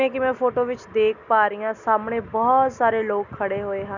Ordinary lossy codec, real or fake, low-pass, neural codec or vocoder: none; real; 7.2 kHz; none